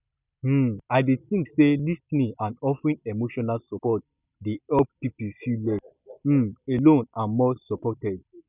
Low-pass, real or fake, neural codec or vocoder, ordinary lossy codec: 3.6 kHz; real; none; none